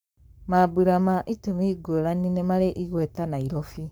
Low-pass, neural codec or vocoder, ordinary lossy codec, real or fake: none; codec, 44.1 kHz, 7.8 kbps, Pupu-Codec; none; fake